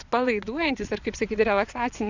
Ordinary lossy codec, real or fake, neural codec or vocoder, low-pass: Opus, 64 kbps; fake; vocoder, 22.05 kHz, 80 mel bands, Vocos; 7.2 kHz